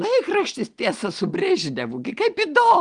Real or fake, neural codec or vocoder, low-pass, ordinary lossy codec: real; none; 9.9 kHz; Opus, 24 kbps